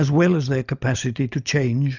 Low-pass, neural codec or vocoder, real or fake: 7.2 kHz; none; real